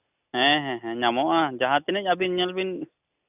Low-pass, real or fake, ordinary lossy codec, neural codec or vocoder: 3.6 kHz; real; none; none